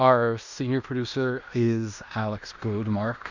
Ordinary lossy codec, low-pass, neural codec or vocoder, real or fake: Opus, 64 kbps; 7.2 kHz; codec, 16 kHz in and 24 kHz out, 0.9 kbps, LongCat-Audio-Codec, fine tuned four codebook decoder; fake